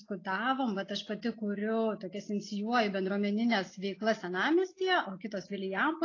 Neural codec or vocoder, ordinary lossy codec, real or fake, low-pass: none; AAC, 32 kbps; real; 7.2 kHz